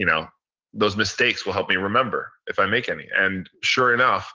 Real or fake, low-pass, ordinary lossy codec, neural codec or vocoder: real; 7.2 kHz; Opus, 16 kbps; none